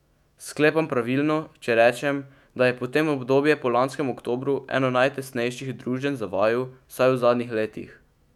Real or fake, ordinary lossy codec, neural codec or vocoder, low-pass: fake; none; autoencoder, 48 kHz, 128 numbers a frame, DAC-VAE, trained on Japanese speech; 19.8 kHz